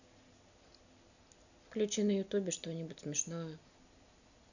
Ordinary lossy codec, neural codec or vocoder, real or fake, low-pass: none; none; real; 7.2 kHz